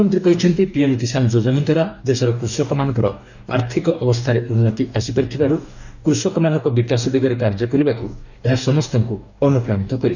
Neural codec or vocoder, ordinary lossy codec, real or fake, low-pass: codec, 44.1 kHz, 2.6 kbps, DAC; none; fake; 7.2 kHz